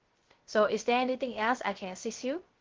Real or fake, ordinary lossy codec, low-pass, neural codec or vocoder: fake; Opus, 16 kbps; 7.2 kHz; codec, 16 kHz, 0.3 kbps, FocalCodec